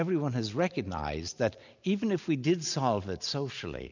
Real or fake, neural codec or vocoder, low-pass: real; none; 7.2 kHz